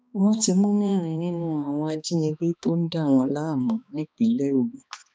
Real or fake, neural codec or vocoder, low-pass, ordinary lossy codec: fake; codec, 16 kHz, 2 kbps, X-Codec, HuBERT features, trained on balanced general audio; none; none